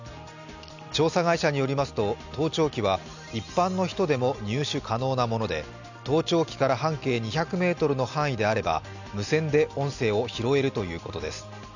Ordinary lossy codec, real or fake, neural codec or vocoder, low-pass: none; real; none; 7.2 kHz